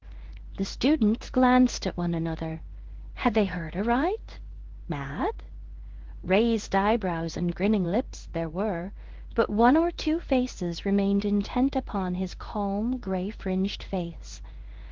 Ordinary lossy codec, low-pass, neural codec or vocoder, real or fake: Opus, 16 kbps; 7.2 kHz; codec, 16 kHz in and 24 kHz out, 1 kbps, XY-Tokenizer; fake